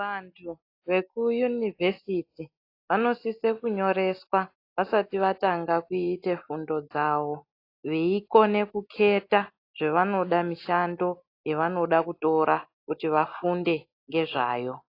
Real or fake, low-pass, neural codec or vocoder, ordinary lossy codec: real; 5.4 kHz; none; AAC, 32 kbps